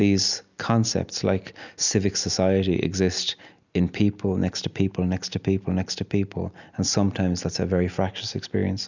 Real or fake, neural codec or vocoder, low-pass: real; none; 7.2 kHz